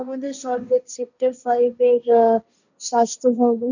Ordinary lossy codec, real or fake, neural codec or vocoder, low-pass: none; fake; codec, 16 kHz, 1.1 kbps, Voila-Tokenizer; 7.2 kHz